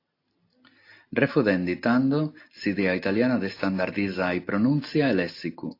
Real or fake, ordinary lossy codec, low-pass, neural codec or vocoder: real; AAC, 32 kbps; 5.4 kHz; none